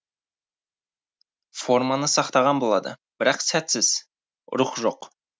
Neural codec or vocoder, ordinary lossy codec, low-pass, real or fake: none; none; none; real